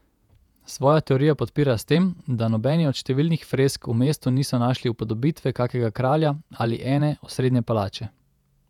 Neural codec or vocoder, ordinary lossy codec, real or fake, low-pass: vocoder, 48 kHz, 128 mel bands, Vocos; none; fake; 19.8 kHz